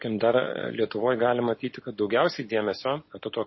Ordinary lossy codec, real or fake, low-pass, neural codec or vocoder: MP3, 24 kbps; real; 7.2 kHz; none